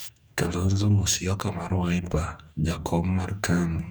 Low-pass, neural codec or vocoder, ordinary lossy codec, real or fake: none; codec, 44.1 kHz, 2.6 kbps, DAC; none; fake